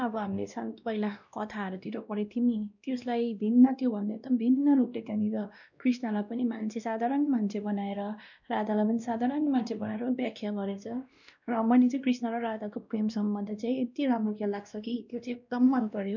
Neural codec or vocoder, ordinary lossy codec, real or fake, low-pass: codec, 16 kHz, 1 kbps, X-Codec, WavLM features, trained on Multilingual LibriSpeech; none; fake; 7.2 kHz